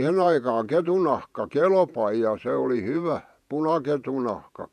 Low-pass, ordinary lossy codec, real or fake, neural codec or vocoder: 14.4 kHz; none; fake; vocoder, 48 kHz, 128 mel bands, Vocos